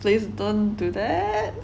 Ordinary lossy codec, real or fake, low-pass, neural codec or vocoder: none; real; none; none